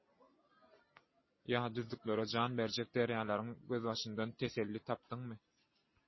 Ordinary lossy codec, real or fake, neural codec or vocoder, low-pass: MP3, 24 kbps; real; none; 7.2 kHz